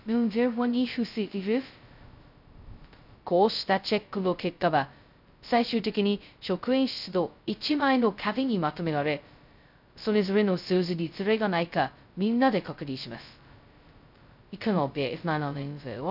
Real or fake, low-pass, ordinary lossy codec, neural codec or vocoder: fake; 5.4 kHz; none; codec, 16 kHz, 0.2 kbps, FocalCodec